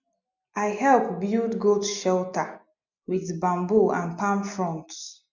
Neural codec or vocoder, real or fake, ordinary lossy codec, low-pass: none; real; none; 7.2 kHz